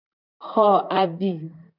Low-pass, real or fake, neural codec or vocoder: 5.4 kHz; fake; vocoder, 44.1 kHz, 128 mel bands, Pupu-Vocoder